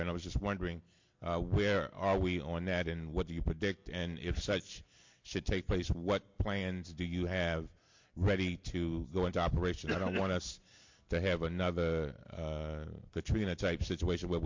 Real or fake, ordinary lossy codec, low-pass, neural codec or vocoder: real; MP3, 48 kbps; 7.2 kHz; none